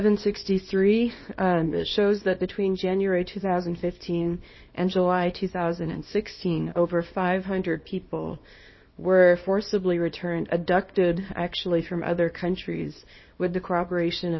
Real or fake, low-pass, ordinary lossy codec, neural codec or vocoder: fake; 7.2 kHz; MP3, 24 kbps; codec, 24 kHz, 0.9 kbps, WavTokenizer, small release